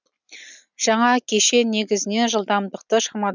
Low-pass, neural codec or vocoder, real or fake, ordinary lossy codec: 7.2 kHz; none; real; none